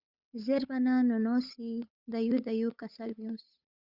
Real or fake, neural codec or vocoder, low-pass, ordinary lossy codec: fake; codec, 16 kHz, 8 kbps, FunCodec, trained on Chinese and English, 25 frames a second; 5.4 kHz; Opus, 64 kbps